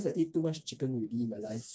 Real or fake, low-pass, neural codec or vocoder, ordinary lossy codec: fake; none; codec, 16 kHz, 2 kbps, FreqCodec, smaller model; none